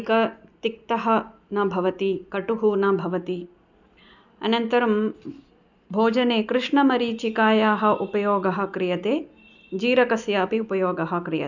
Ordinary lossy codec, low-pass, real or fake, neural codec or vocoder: none; 7.2 kHz; real; none